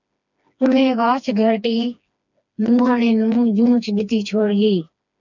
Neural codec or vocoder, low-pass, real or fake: codec, 16 kHz, 2 kbps, FreqCodec, smaller model; 7.2 kHz; fake